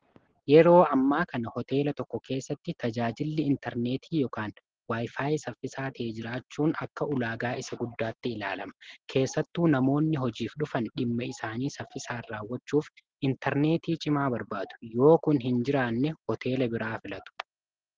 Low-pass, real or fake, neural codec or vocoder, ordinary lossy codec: 7.2 kHz; real; none; Opus, 16 kbps